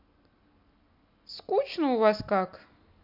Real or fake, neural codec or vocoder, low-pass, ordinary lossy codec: real; none; 5.4 kHz; MP3, 48 kbps